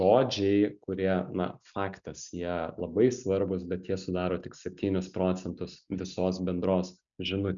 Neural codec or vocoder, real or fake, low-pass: none; real; 7.2 kHz